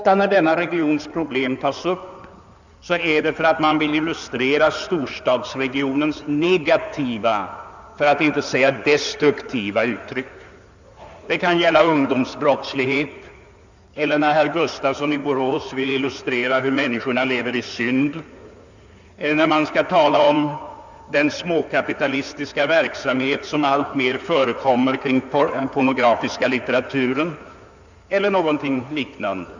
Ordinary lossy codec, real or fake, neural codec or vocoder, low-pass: none; fake; codec, 16 kHz in and 24 kHz out, 2.2 kbps, FireRedTTS-2 codec; 7.2 kHz